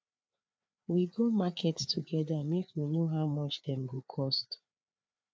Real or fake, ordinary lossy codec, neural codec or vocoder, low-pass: fake; none; codec, 16 kHz, 4 kbps, FreqCodec, larger model; none